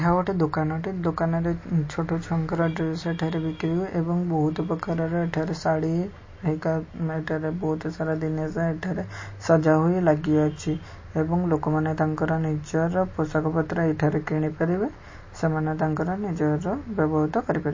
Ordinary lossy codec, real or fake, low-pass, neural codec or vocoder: MP3, 32 kbps; real; 7.2 kHz; none